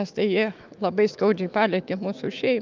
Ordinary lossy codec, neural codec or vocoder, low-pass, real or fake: Opus, 24 kbps; autoencoder, 48 kHz, 128 numbers a frame, DAC-VAE, trained on Japanese speech; 7.2 kHz; fake